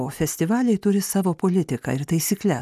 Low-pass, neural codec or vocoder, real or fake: 14.4 kHz; none; real